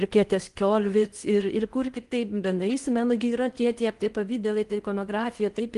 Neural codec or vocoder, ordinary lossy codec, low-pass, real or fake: codec, 16 kHz in and 24 kHz out, 0.8 kbps, FocalCodec, streaming, 65536 codes; Opus, 24 kbps; 10.8 kHz; fake